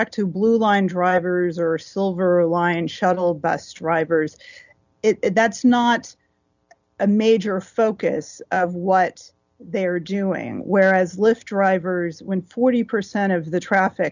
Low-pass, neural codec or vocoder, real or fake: 7.2 kHz; none; real